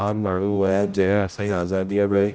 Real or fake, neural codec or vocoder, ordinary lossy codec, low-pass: fake; codec, 16 kHz, 0.5 kbps, X-Codec, HuBERT features, trained on general audio; none; none